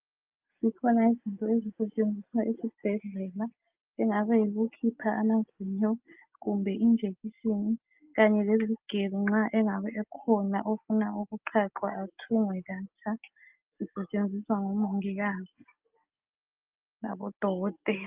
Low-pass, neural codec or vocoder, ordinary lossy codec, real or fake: 3.6 kHz; none; Opus, 24 kbps; real